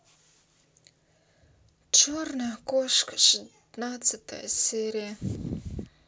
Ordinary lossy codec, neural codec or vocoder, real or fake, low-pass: none; none; real; none